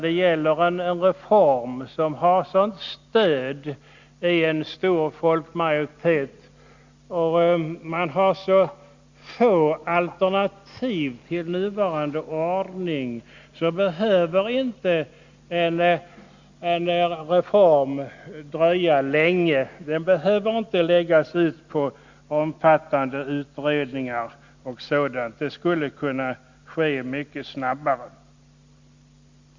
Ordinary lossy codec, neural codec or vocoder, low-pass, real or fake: Opus, 64 kbps; none; 7.2 kHz; real